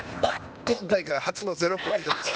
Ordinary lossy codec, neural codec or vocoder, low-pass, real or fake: none; codec, 16 kHz, 0.8 kbps, ZipCodec; none; fake